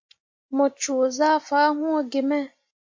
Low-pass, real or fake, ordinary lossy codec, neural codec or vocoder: 7.2 kHz; real; MP3, 48 kbps; none